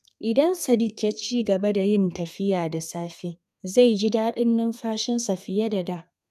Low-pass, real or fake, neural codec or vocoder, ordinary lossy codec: 14.4 kHz; fake; codec, 32 kHz, 1.9 kbps, SNAC; none